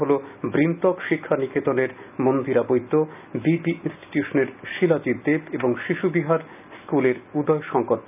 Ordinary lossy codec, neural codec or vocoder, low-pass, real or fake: none; none; 3.6 kHz; real